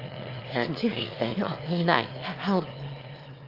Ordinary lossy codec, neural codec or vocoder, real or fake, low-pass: Opus, 32 kbps; autoencoder, 22.05 kHz, a latent of 192 numbers a frame, VITS, trained on one speaker; fake; 5.4 kHz